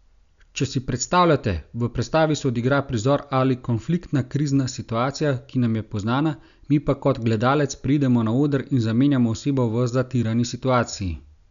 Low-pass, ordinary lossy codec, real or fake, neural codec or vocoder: 7.2 kHz; none; real; none